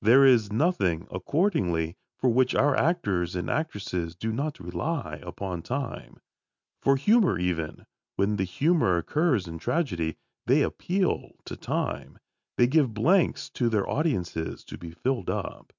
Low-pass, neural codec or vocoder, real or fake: 7.2 kHz; none; real